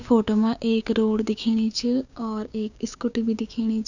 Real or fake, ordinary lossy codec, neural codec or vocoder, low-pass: fake; none; codec, 16 kHz, 6 kbps, DAC; 7.2 kHz